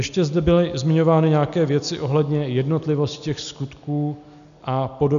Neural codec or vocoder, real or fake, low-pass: none; real; 7.2 kHz